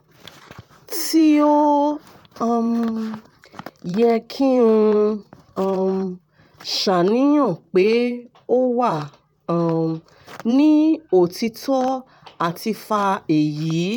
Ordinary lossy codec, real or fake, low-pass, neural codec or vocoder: none; fake; 19.8 kHz; vocoder, 44.1 kHz, 128 mel bands, Pupu-Vocoder